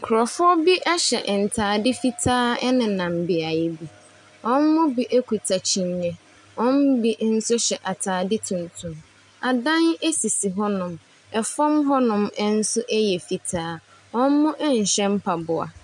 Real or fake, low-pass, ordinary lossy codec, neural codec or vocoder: real; 10.8 kHz; MP3, 96 kbps; none